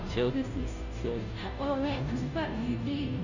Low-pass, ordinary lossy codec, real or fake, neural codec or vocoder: 7.2 kHz; none; fake; codec, 16 kHz, 0.5 kbps, FunCodec, trained on Chinese and English, 25 frames a second